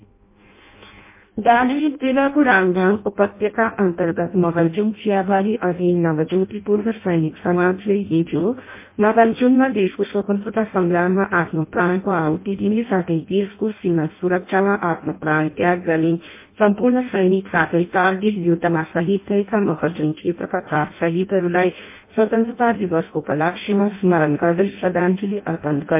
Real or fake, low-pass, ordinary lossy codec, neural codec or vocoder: fake; 3.6 kHz; MP3, 24 kbps; codec, 16 kHz in and 24 kHz out, 0.6 kbps, FireRedTTS-2 codec